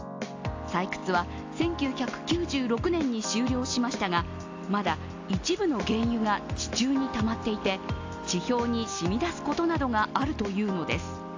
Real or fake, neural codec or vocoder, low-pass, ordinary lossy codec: real; none; 7.2 kHz; AAC, 48 kbps